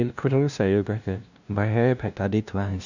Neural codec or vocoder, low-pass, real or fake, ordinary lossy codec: codec, 16 kHz, 0.5 kbps, FunCodec, trained on LibriTTS, 25 frames a second; 7.2 kHz; fake; none